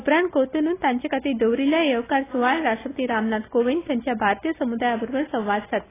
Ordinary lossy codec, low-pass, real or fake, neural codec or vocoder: AAC, 16 kbps; 3.6 kHz; real; none